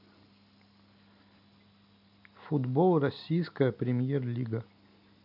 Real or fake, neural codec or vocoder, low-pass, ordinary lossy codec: real; none; 5.4 kHz; none